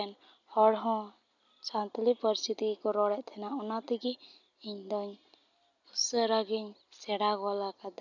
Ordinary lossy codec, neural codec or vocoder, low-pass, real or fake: none; none; 7.2 kHz; real